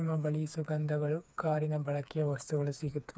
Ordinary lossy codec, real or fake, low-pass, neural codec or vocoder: none; fake; none; codec, 16 kHz, 4 kbps, FreqCodec, smaller model